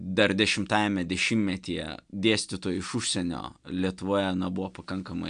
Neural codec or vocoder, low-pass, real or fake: none; 9.9 kHz; real